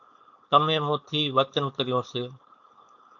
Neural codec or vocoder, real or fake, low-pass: codec, 16 kHz, 4.8 kbps, FACodec; fake; 7.2 kHz